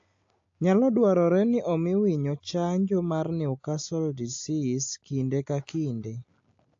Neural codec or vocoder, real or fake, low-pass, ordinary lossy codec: none; real; 7.2 kHz; AAC, 48 kbps